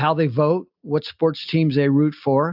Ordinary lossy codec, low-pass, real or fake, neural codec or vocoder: AAC, 48 kbps; 5.4 kHz; real; none